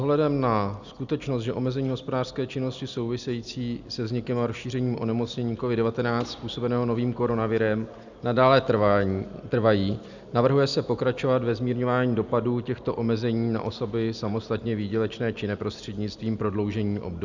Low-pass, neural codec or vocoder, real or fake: 7.2 kHz; none; real